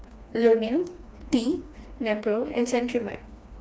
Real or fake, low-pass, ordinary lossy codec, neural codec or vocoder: fake; none; none; codec, 16 kHz, 2 kbps, FreqCodec, smaller model